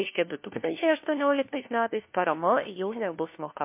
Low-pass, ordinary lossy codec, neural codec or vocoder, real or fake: 3.6 kHz; MP3, 24 kbps; codec, 16 kHz, 1 kbps, FunCodec, trained on LibriTTS, 50 frames a second; fake